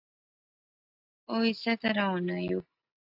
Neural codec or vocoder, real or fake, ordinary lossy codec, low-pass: none; real; AAC, 48 kbps; 5.4 kHz